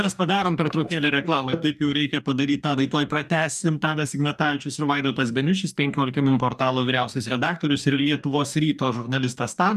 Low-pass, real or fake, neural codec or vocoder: 14.4 kHz; fake; codec, 44.1 kHz, 2.6 kbps, DAC